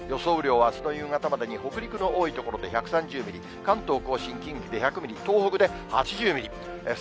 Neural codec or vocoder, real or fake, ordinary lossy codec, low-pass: none; real; none; none